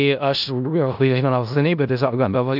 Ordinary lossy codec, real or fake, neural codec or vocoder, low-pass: AAC, 48 kbps; fake; codec, 16 kHz in and 24 kHz out, 0.4 kbps, LongCat-Audio-Codec, four codebook decoder; 5.4 kHz